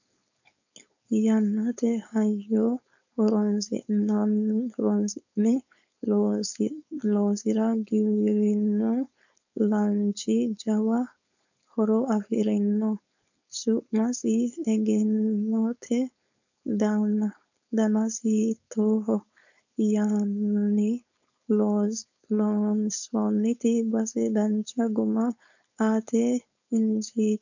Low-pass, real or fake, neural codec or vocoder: 7.2 kHz; fake; codec, 16 kHz, 4.8 kbps, FACodec